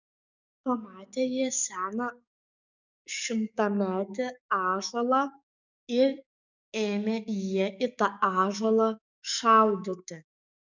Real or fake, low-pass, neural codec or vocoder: fake; 7.2 kHz; codec, 44.1 kHz, 7.8 kbps, DAC